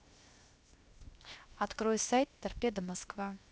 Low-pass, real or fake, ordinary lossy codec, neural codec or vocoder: none; fake; none; codec, 16 kHz, 0.7 kbps, FocalCodec